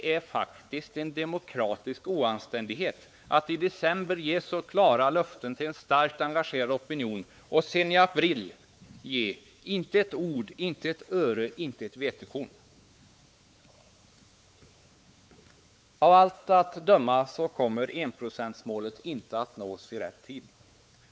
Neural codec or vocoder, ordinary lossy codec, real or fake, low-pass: codec, 16 kHz, 4 kbps, X-Codec, WavLM features, trained on Multilingual LibriSpeech; none; fake; none